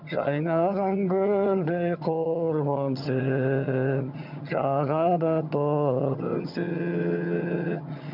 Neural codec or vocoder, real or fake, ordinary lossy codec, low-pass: vocoder, 22.05 kHz, 80 mel bands, HiFi-GAN; fake; none; 5.4 kHz